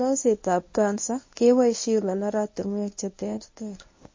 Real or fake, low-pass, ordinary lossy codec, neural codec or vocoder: fake; 7.2 kHz; MP3, 32 kbps; codec, 24 kHz, 0.9 kbps, WavTokenizer, medium speech release version 1